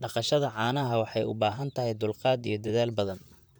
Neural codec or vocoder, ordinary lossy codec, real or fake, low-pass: vocoder, 44.1 kHz, 128 mel bands, Pupu-Vocoder; none; fake; none